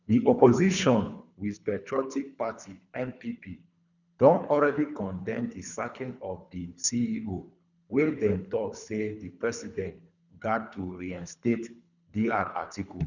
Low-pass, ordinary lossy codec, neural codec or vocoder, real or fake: 7.2 kHz; none; codec, 24 kHz, 3 kbps, HILCodec; fake